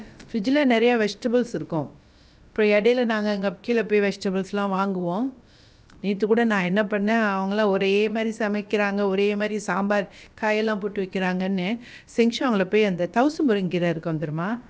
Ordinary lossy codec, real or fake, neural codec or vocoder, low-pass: none; fake; codec, 16 kHz, about 1 kbps, DyCAST, with the encoder's durations; none